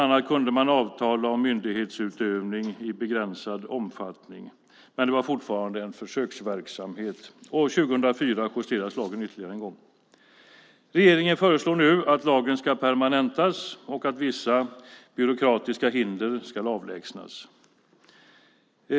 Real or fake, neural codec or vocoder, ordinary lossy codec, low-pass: real; none; none; none